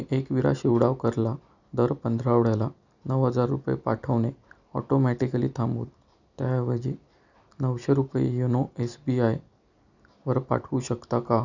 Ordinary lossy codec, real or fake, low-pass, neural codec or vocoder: none; real; 7.2 kHz; none